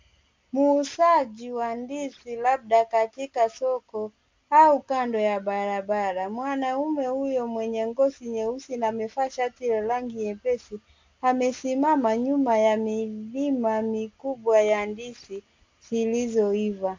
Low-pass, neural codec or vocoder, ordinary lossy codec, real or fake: 7.2 kHz; none; MP3, 64 kbps; real